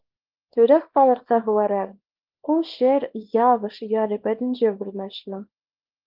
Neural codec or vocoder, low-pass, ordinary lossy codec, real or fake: codec, 24 kHz, 0.9 kbps, WavTokenizer, small release; 5.4 kHz; Opus, 32 kbps; fake